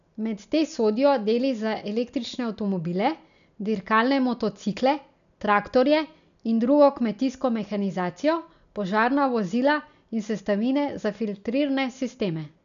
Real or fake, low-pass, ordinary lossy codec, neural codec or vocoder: real; 7.2 kHz; none; none